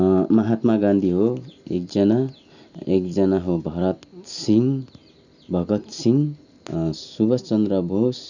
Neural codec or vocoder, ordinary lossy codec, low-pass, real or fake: none; none; 7.2 kHz; real